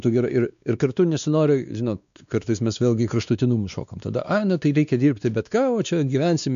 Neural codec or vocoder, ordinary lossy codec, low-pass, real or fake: codec, 16 kHz, 2 kbps, X-Codec, WavLM features, trained on Multilingual LibriSpeech; MP3, 96 kbps; 7.2 kHz; fake